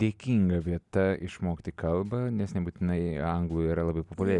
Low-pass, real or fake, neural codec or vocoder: 10.8 kHz; real; none